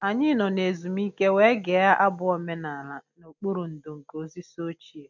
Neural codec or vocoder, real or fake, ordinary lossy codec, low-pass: none; real; none; 7.2 kHz